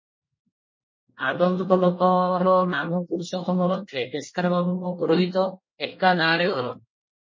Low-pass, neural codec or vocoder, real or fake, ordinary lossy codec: 7.2 kHz; codec, 24 kHz, 1 kbps, SNAC; fake; MP3, 32 kbps